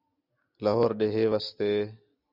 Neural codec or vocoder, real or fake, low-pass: none; real; 5.4 kHz